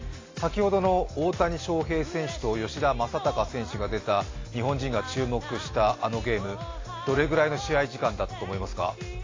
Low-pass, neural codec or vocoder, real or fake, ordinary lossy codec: 7.2 kHz; none; real; AAC, 32 kbps